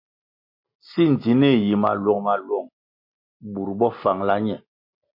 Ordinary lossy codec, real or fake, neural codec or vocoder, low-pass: MP3, 32 kbps; real; none; 5.4 kHz